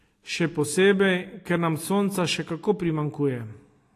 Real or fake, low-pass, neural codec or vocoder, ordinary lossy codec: real; 14.4 kHz; none; AAC, 48 kbps